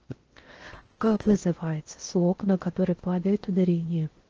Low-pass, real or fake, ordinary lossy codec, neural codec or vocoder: 7.2 kHz; fake; Opus, 16 kbps; codec, 16 kHz in and 24 kHz out, 0.6 kbps, FocalCodec, streaming, 4096 codes